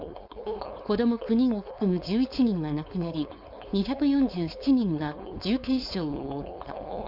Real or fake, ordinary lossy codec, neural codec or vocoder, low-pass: fake; none; codec, 16 kHz, 4.8 kbps, FACodec; 5.4 kHz